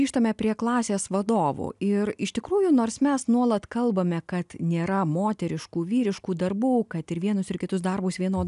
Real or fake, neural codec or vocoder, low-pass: real; none; 10.8 kHz